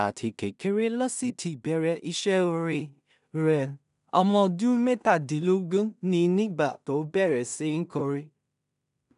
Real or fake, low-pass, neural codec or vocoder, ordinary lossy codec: fake; 10.8 kHz; codec, 16 kHz in and 24 kHz out, 0.4 kbps, LongCat-Audio-Codec, two codebook decoder; none